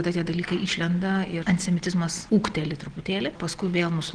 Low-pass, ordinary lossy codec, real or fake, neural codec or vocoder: 9.9 kHz; Opus, 16 kbps; real; none